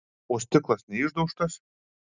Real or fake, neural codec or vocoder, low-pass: real; none; 7.2 kHz